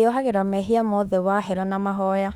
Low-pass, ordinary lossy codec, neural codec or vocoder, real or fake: 19.8 kHz; Opus, 64 kbps; autoencoder, 48 kHz, 32 numbers a frame, DAC-VAE, trained on Japanese speech; fake